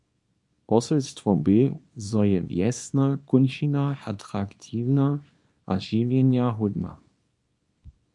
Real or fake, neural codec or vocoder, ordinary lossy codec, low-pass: fake; codec, 24 kHz, 0.9 kbps, WavTokenizer, small release; MP3, 64 kbps; 10.8 kHz